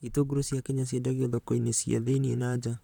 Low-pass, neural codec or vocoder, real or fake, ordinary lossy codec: 19.8 kHz; vocoder, 44.1 kHz, 128 mel bands, Pupu-Vocoder; fake; none